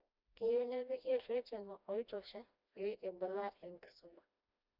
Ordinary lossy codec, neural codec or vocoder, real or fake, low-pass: none; codec, 16 kHz, 1 kbps, FreqCodec, smaller model; fake; 5.4 kHz